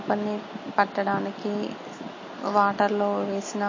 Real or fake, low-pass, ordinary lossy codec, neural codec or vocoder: real; 7.2 kHz; MP3, 32 kbps; none